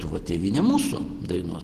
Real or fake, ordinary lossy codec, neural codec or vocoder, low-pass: fake; Opus, 16 kbps; vocoder, 48 kHz, 128 mel bands, Vocos; 14.4 kHz